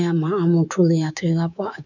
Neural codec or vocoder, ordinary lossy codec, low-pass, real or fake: codec, 24 kHz, 3.1 kbps, DualCodec; none; 7.2 kHz; fake